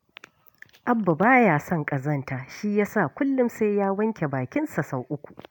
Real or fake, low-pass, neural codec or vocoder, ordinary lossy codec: real; none; none; none